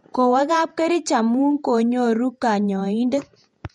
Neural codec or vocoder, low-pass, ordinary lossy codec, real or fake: vocoder, 48 kHz, 128 mel bands, Vocos; 19.8 kHz; MP3, 48 kbps; fake